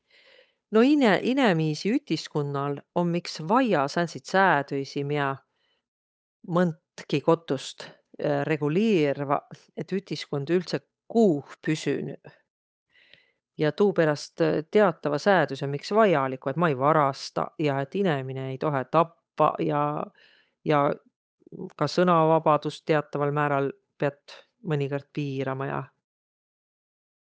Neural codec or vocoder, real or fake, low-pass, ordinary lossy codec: codec, 16 kHz, 8 kbps, FunCodec, trained on Chinese and English, 25 frames a second; fake; none; none